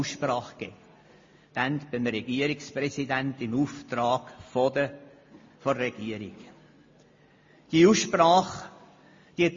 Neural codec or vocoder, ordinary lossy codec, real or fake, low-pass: none; MP3, 32 kbps; real; 7.2 kHz